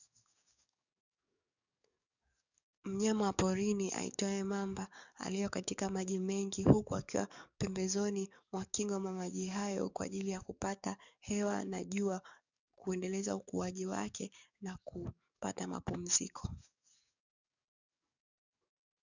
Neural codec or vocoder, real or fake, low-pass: codec, 44.1 kHz, 7.8 kbps, DAC; fake; 7.2 kHz